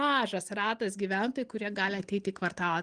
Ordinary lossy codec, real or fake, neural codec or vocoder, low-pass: Opus, 24 kbps; real; none; 9.9 kHz